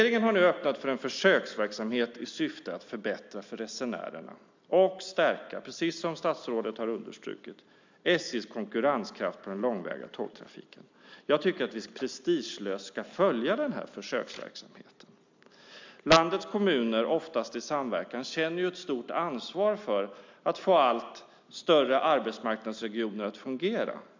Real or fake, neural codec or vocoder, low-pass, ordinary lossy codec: real; none; 7.2 kHz; MP3, 64 kbps